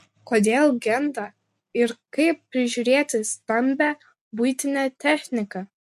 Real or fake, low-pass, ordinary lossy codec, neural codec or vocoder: real; 14.4 kHz; MP3, 64 kbps; none